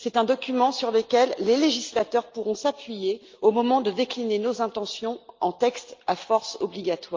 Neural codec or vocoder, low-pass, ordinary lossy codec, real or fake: none; 7.2 kHz; Opus, 32 kbps; real